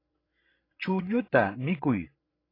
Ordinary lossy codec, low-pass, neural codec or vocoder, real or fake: AAC, 24 kbps; 5.4 kHz; codec, 16 kHz, 16 kbps, FreqCodec, larger model; fake